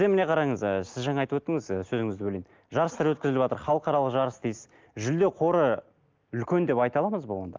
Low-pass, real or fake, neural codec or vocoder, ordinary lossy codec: 7.2 kHz; real; none; Opus, 32 kbps